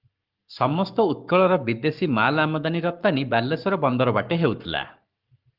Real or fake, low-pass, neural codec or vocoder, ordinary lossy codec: fake; 5.4 kHz; codec, 44.1 kHz, 7.8 kbps, Pupu-Codec; Opus, 32 kbps